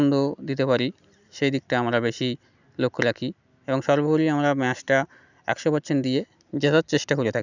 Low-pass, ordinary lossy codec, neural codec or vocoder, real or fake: 7.2 kHz; none; none; real